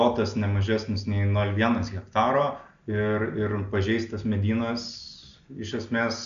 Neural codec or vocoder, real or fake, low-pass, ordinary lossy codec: none; real; 7.2 kHz; AAC, 96 kbps